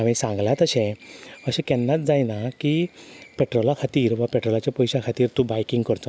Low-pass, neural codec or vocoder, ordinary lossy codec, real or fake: none; none; none; real